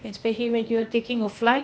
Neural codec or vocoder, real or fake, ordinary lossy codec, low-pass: codec, 16 kHz, 0.8 kbps, ZipCodec; fake; none; none